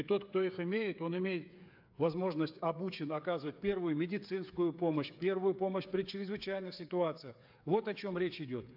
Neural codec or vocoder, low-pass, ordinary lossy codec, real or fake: codec, 16 kHz, 8 kbps, FreqCodec, smaller model; 5.4 kHz; AAC, 48 kbps; fake